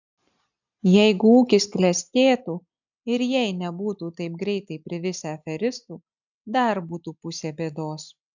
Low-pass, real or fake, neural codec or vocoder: 7.2 kHz; real; none